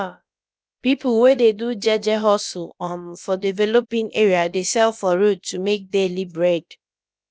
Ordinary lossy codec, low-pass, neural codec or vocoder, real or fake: none; none; codec, 16 kHz, about 1 kbps, DyCAST, with the encoder's durations; fake